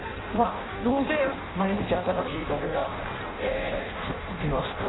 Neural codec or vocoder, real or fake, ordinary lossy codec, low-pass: codec, 16 kHz in and 24 kHz out, 0.6 kbps, FireRedTTS-2 codec; fake; AAC, 16 kbps; 7.2 kHz